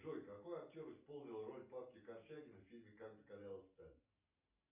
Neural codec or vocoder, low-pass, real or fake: none; 3.6 kHz; real